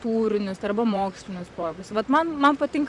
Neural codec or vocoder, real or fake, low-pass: vocoder, 44.1 kHz, 128 mel bands, Pupu-Vocoder; fake; 10.8 kHz